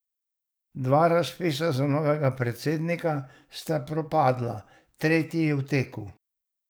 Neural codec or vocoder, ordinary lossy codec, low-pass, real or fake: codec, 44.1 kHz, 7.8 kbps, DAC; none; none; fake